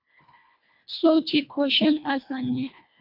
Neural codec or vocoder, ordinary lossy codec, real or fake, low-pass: codec, 24 kHz, 1.5 kbps, HILCodec; MP3, 48 kbps; fake; 5.4 kHz